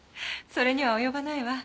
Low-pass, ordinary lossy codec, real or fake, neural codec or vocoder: none; none; real; none